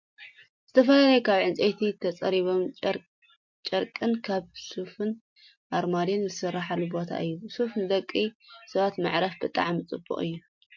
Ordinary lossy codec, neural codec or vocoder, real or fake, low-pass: MP3, 48 kbps; none; real; 7.2 kHz